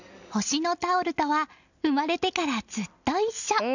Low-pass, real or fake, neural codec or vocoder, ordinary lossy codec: 7.2 kHz; real; none; none